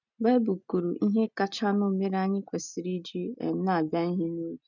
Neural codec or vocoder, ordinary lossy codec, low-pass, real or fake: none; none; 7.2 kHz; real